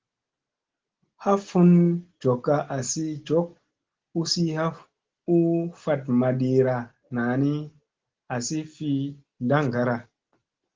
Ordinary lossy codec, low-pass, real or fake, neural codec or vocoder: Opus, 16 kbps; 7.2 kHz; real; none